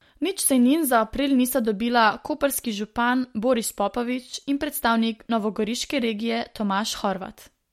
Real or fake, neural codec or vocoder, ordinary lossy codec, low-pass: real; none; MP3, 64 kbps; 19.8 kHz